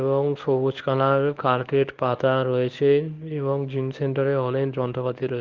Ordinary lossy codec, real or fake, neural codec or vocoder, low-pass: Opus, 24 kbps; fake; codec, 24 kHz, 0.9 kbps, WavTokenizer, medium speech release version 1; 7.2 kHz